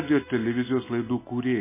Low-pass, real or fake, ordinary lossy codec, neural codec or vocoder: 3.6 kHz; real; MP3, 16 kbps; none